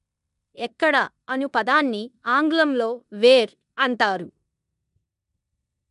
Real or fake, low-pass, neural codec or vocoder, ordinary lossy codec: fake; 10.8 kHz; codec, 16 kHz in and 24 kHz out, 0.9 kbps, LongCat-Audio-Codec, four codebook decoder; none